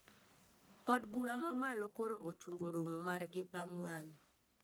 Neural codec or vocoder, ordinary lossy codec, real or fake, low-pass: codec, 44.1 kHz, 1.7 kbps, Pupu-Codec; none; fake; none